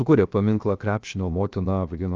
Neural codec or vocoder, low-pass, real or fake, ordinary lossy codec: codec, 16 kHz, 0.7 kbps, FocalCodec; 7.2 kHz; fake; Opus, 32 kbps